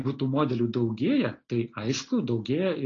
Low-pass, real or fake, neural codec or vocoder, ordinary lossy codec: 7.2 kHz; real; none; AAC, 32 kbps